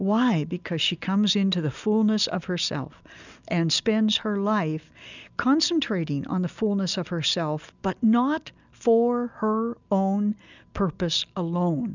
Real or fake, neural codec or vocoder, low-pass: real; none; 7.2 kHz